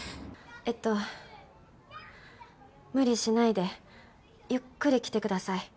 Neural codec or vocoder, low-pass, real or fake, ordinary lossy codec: none; none; real; none